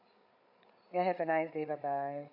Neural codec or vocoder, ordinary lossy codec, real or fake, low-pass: codec, 16 kHz, 8 kbps, FreqCodec, larger model; none; fake; 5.4 kHz